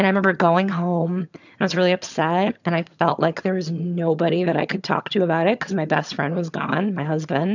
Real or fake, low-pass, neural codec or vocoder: fake; 7.2 kHz; vocoder, 22.05 kHz, 80 mel bands, HiFi-GAN